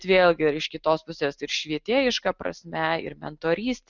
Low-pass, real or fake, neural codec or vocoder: 7.2 kHz; real; none